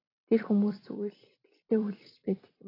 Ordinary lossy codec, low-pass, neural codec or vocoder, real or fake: AAC, 24 kbps; 5.4 kHz; vocoder, 44.1 kHz, 128 mel bands every 256 samples, BigVGAN v2; fake